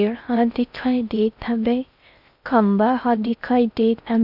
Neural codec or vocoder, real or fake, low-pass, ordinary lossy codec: codec, 16 kHz in and 24 kHz out, 0.6 kbps, FocalCodec, streaming, 4096 codes; fake; 5.4 kHz; none